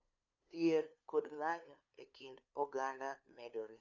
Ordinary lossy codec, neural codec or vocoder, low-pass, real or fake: none; codec, 16 kHz, 2 kbps, FunCodec, trained on LibriTTS, 25 frames a second; 7.2 kHz; fake